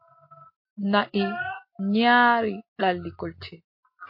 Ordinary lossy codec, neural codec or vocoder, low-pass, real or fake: MP3, 32 kbps; none; 5.4 kHz; real